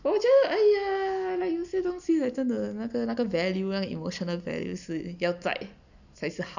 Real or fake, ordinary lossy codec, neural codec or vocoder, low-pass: real; none; none; 7.2 kHz